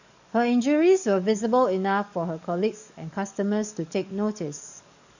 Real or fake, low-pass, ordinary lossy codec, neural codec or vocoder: fake; 7.2 kHz; none; codec, 44.1 kHz, 7.8 kbps, DAC